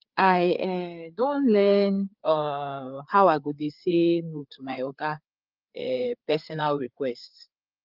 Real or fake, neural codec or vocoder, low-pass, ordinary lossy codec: fake; codec, 16 kHz, 4 kbps, FreqCodec, larger model; 5.4 kHz; Opus, 32 kbps